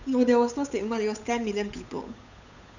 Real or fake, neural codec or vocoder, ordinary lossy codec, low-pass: fake; codec, 16 kHz, 8 kbps, FunCodec, trained on Chinese and English, 25 frames a second; none; 7.2 kHz